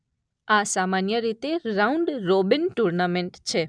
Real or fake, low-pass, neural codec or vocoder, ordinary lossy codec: real; 9.9 kHz; none; none